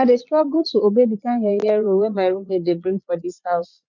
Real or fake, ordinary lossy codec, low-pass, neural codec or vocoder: fake; none; 7.2 kHz; vocoder, 22.05 kHz, 80 mel bands, Vocos